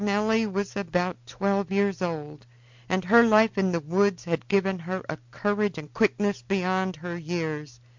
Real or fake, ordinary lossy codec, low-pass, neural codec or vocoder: real; MP3, 64 kbps; 7.2 kHz; none